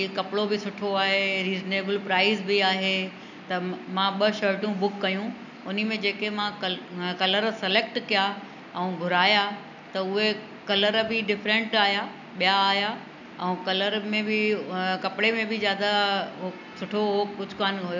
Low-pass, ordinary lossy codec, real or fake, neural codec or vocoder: 7.2 kHz; none; real; none